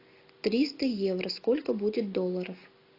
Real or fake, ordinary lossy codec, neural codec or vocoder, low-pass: real; AAC, 32 kbps; none; 5.4 kHz